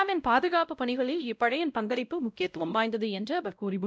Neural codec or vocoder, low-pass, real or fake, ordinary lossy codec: codec, 16 kHz, 0.5 kbps, X-Codec, WavLM features, trained on Multilingual LibriSpeech; none; fake; none